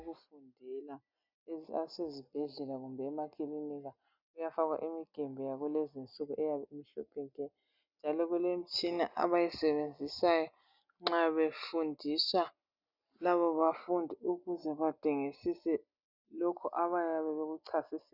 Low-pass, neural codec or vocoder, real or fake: 5.4 kHz; none; real